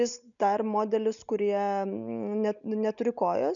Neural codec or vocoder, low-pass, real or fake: none; 7.2 kHz; real